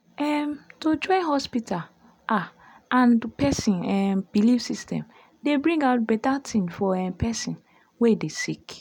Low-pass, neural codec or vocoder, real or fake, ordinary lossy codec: 19.8 kHz; none; real; none